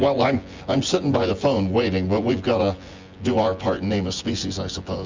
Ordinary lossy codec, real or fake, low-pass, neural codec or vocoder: Opus, 32 kbps; fake; 7.2 kHz; vocoder, 24 kHz, 100 mel bands, Vocos